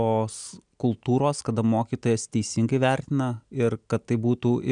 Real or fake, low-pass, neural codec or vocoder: real; 9.9 kHz; none